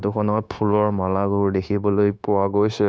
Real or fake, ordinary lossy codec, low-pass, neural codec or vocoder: fake; none; none; codec, 16 kHz, 0.9 kbps, LongCat-Audio-Codec